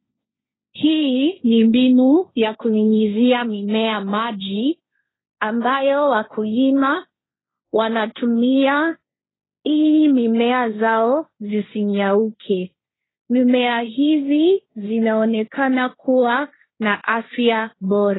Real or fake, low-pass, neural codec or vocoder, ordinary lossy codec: fake; 7.2 kHz; codec, 16 kHz, 1.1 kbps, Voila-Tokenizer; AAC, 16 kbps